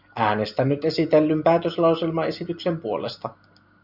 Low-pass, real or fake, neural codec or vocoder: 5.4 kHz; real; none